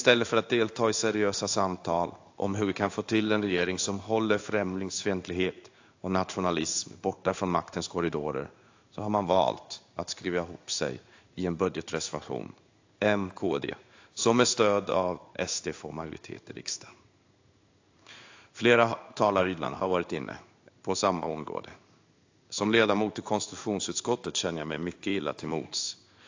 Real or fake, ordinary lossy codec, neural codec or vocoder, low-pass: fake; AAC, 48 kbps; codec, 16 kHz in and 24 kHz out, 1 kbps, XY-Tokenizer; 7.2 kHz